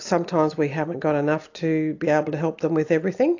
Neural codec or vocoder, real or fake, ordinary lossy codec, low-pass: none; real; AAC, 48 kbps; 7.2 kHz